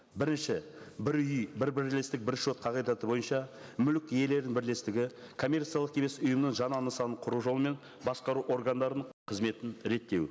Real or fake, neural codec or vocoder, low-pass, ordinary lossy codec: real; none; none; none